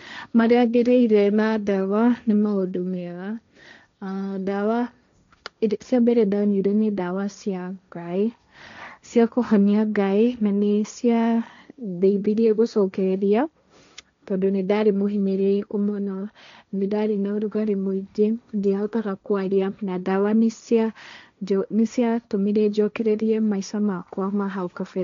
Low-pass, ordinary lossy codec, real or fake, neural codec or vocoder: 7.2 kHz; MP3, 64 kbps; fake; codec, 16 kHz, 1.1 kbps, Voila-Tokenizer